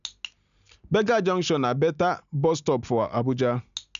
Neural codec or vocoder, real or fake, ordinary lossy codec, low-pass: none; real; none; 7.2 kHz